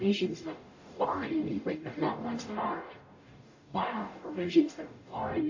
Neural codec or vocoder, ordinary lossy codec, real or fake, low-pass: codec, 44.1 kHz, 0.9 kbps, DAC; AAC, 48 kbps; fake; 7.2 kHz